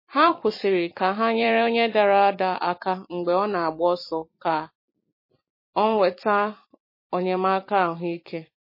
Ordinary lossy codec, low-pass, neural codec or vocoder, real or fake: MP3, 24 kbps; 5.4 kHz; vocoder, 44.1 kHz, 128 mel bands every 256 samples, BigVGAN v2; fake